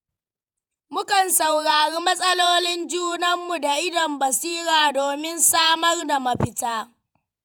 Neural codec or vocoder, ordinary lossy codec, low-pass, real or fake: vocoder, 48 kHz, 128 mel bands, Vocos; none; none; fake